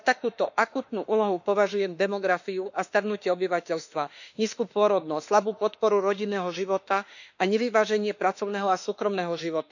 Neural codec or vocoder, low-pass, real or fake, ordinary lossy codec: autoencoder, 48 kHz, 32 numbers a frame, DAC-VAE, trained on Japanese speech; 7.2 kHz; fake; none